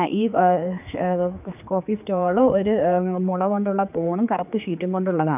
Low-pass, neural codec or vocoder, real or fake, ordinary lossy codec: 3.6 kHz; codec, 16 kHz, 4 kbps, X-Codec, HuBERT features, trained on general audio; fake; none